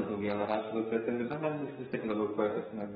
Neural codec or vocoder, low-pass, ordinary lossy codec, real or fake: codec, 32 kHz, 1.9 kbps, SNAC; 14.4 kHz; AAC, 16 kbps; fake